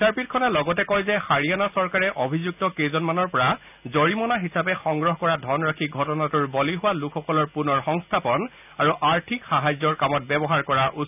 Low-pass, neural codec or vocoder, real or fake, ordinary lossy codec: 3.6 kHz; none; real; none